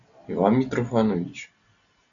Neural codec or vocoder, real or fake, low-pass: none; real; 7.2 kHz